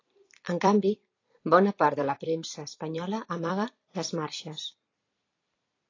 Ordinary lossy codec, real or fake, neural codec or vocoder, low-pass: AAC, 32 kbps; fake; vocoder, 44.1 kHz, 128 mel bands every 256 samples, BigVGAN v2; 7.2 kHz